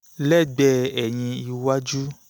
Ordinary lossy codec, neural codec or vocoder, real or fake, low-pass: none; none; real; none